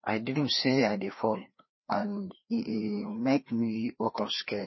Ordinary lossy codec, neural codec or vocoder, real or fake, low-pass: MP3, 24 kbps; codec, 16 kHz, 2 kbps, FreqCodec, larger model; fake; 7.2 kHz